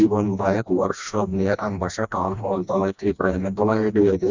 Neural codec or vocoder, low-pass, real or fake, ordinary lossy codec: codec, 16 kHz, 1 kbps, FreqCodec, smaller model; 7.2 kHz; fake; Opus, 64 kbps